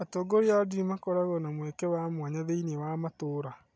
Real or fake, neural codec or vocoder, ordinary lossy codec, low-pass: real; none; none; none